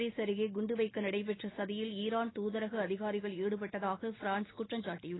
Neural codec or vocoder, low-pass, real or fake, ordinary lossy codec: none; 7.2 kHz; real; AAC, 16 kbps